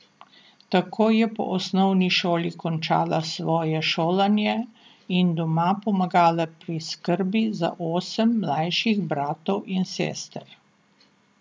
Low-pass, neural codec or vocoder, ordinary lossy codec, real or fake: none; none; none; real